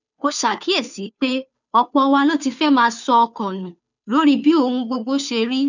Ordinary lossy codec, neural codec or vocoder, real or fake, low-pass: none; codec, 16 kHz, 2 kbps, FunCodec, trained on Chinese and English, 25 frames a second; fake; 7.2 kHz